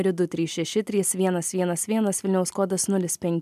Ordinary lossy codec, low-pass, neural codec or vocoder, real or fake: MP3, 96 kbps; 14.4 kHz; none; real